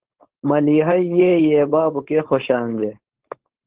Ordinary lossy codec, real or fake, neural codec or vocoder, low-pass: Opus, 16 kbps; fake; codec, 16 kHz, 4.8 kbps, FACodec; 3.6 kHz